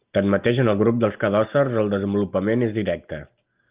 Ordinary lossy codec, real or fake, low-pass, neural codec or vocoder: Opus, 24 kbps; real; 3.6 kHz; none